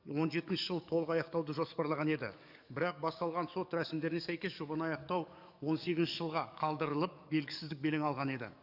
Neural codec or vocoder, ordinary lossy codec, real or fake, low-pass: codec, 44.1 kHz, 7.8 kbps, DAC; none; fake; 5.4 kHz